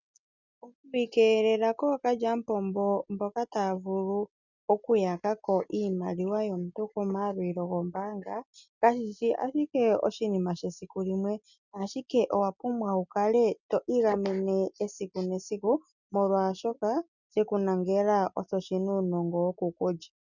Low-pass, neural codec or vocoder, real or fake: 7.2 kHz; none; real